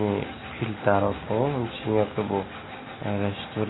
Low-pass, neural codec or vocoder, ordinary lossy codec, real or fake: 7.2 kHz; none; AAC, 16 kbps; real